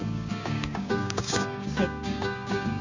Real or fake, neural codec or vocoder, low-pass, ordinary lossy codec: fake; codec, 16 kHz, 6 kbps, DAC; 7.2 kHz; Opus, 64 kbps